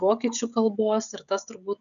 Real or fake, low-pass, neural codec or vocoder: fake; 7.2 kHz; codec, 16 kHz, 16 kbps, FreqCodec, smaller model